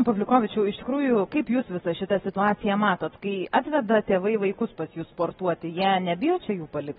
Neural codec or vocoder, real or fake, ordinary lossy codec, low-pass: none; real; AAC, 16 kbps; 19.8 kHz